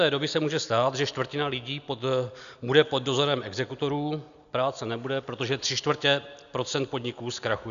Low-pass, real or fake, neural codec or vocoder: 7.2 kHz; real; none